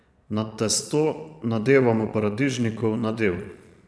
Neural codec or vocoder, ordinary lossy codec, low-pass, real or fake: vocoder, 22.05 kHz, 80 mel bands, Vocos; none; none; fake